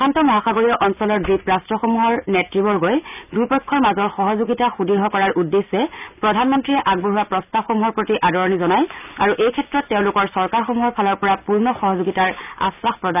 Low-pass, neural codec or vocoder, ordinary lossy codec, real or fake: 3.6 kHz; none; none; real